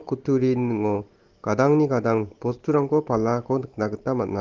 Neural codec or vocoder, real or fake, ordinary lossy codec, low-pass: none; real; Opus, 16 kbps; 7.2 kHz